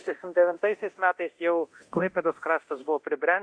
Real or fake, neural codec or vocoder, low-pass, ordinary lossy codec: fake; codec, 24 kHz, 0.9 kbps, DualCodec; 9.9 kHz; Opus, 64 kbps